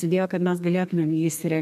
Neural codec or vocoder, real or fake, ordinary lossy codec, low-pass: codec, 32 kHz, 1.9 kbps, SNAC; fake; MP3, 96 kbps; 14.4 kHz